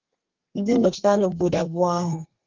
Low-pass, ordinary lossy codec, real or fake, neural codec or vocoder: 7.2 kHz; Opus, 32 kbps; fake; codec, 32 kHz, 1.9 kbps, SNAC